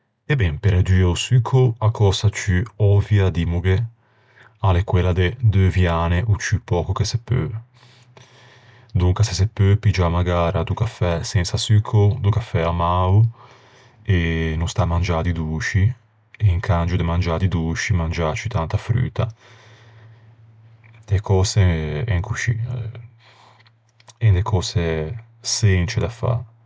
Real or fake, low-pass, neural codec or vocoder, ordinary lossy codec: real; none; none; none